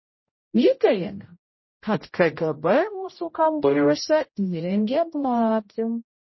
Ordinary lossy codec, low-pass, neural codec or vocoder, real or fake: MP3, 24 kbps; 7.2 kHz; codec, 16 kHz, 0.5 kbps, X-Codec, HuBERT features, trained on general audio; fake